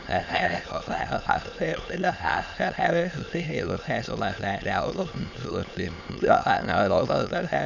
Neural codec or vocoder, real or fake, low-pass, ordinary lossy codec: autoencoder, 22.05 kHz, a latent of 192 numbers a frame, VITS, trained on many speakers; fake; 7.2 kHz; none